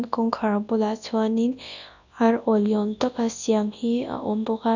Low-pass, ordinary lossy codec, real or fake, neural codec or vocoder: 7.2 kHz; none; fake; codec, 24 kHz, 0.9 kbps, WavTokenizer, large speech release